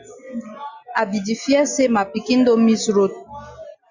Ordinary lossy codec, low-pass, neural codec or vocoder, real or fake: Opus, 64 kbps; 7.2 kHz; none; real